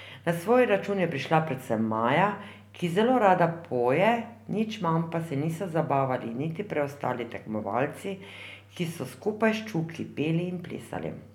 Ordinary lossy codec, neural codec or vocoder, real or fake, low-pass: none; none; real; 19.8 kHz